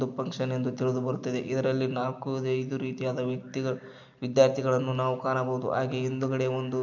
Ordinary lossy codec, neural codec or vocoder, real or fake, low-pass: none; none; real; 7.2 kHz